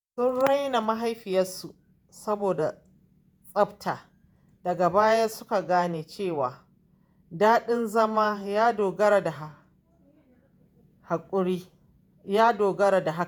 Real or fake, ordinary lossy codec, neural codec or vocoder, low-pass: fake; none; vocoder, 48 kHz, 128 mel bands, Vocos; none